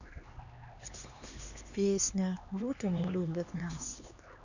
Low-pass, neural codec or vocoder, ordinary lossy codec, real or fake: 7.2 kHz; codec, 16 kHz, 2 kbps, X-Codec, HuBERT features, trained on LibriSpeech; none; fake